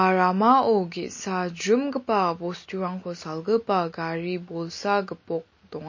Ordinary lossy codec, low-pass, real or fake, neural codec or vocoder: MP3, 32 kbps; 7.2 kHz; real; none